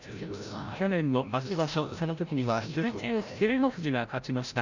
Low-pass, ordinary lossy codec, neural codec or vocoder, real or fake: 7.2 kHz; none; codec, 16 kHz, 0.5 kbps, FreqCodec, larger model; fake